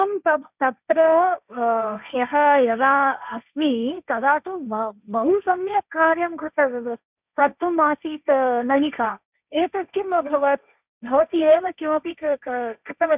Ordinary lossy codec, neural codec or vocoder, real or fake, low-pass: none; codec, 16 kHz, 1.1 kbps, Voila-Tokenizer; fake; 3.6 kHz